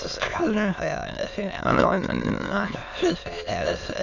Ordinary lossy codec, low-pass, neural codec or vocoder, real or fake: none; 7.2 kHz; autoencoder, 22.05 kHz, a latent of 192 numbers a frame, VITS, trained on many speakers; fake